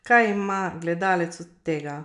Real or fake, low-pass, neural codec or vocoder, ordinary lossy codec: real; 10.8 kHz; none; none